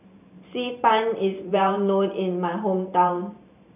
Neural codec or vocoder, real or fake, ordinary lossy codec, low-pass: vocoder, 44.1 kHz, 128 mel bands every 512 samples, BigVGAN v2; fake; none; 3.6 kHz